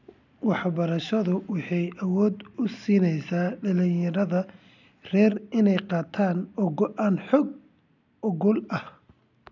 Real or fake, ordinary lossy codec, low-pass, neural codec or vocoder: real; none; 7.2 kHz; none